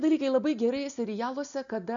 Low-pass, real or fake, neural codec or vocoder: 7.2 kHz; real; none